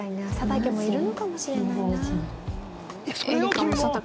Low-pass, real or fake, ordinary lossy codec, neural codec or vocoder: none; real; none; none